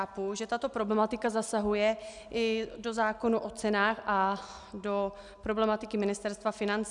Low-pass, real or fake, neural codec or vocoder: 10.8 kHz; real; none